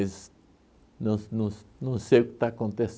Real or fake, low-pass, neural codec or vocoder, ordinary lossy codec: real; none; none; none